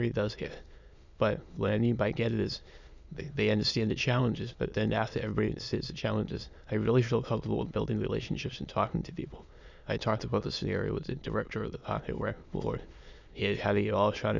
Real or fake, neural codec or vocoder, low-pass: fake; autoencoder, 22.05 kHz, a latent of 192 numbers a frame, VITS, trained on many speakers; 7.2 kHz